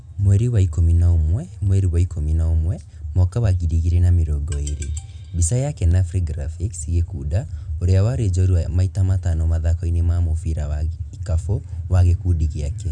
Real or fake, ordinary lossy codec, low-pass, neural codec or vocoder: real; none; 9.9 kHz; none